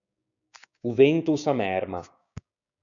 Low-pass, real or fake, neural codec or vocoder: 7.2 kHz; fake; codec, 16 kHz, 6 kbps, DAC